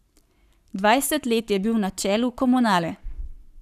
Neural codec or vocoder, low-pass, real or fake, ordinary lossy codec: codec, 44.1 kHz, 7.8 kbps, Pupu-Codec; 14.4 kHz; fake; none